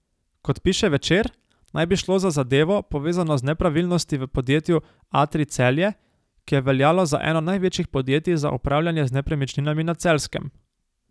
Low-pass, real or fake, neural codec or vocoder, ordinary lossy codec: none; real; none; none